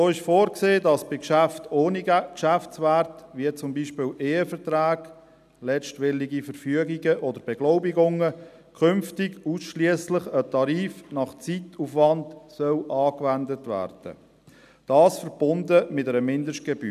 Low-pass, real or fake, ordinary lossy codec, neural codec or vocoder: 14.4 kHz; real; none; none